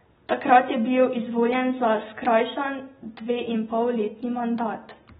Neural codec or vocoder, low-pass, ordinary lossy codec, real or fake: none; 10.8 kHz; AAC, 16 kbps; real